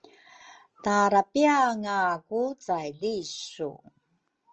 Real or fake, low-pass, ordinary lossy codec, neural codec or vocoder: real; 7.2 kHz; Opus, 24 kbps; none